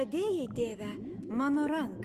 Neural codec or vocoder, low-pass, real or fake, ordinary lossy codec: autoencoder, 48 kHz, 128 numbers a frame, DAC-VAE, trained on Japanese speech; 14.4 kHz; fake; Opus, 32 kbps